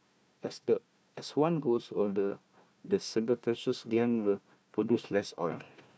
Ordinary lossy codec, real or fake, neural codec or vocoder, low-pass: none; fake; codec, 16 kHz, 1 kbps, FunCodec, trained on Chinese and English, 50 frames a second; none